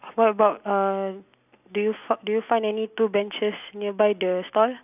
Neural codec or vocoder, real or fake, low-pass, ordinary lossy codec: none; real; 3.6 kHz; none